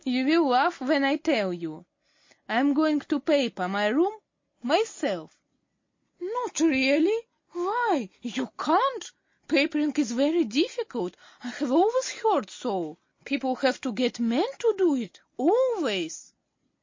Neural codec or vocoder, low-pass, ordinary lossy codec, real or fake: none; 7.2 kHz; MP3, 32 kbps; real